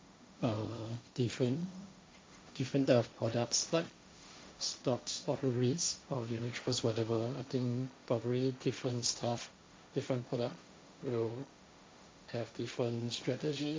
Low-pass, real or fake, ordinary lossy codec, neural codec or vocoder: none; fake; none; codec, 16 kHz, 1.1 kbps, Voila-Tokenizer